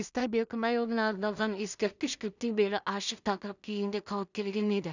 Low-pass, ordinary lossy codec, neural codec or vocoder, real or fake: 7.2 kHz; none; codec, 16 kHz in and 24 kHz out, 0.4 kbps, LongCat-Audio-Codec, two codebook decoder; fake